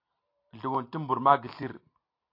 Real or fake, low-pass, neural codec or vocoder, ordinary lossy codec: real; 5.4 kHz; none; AAC, 32 kbps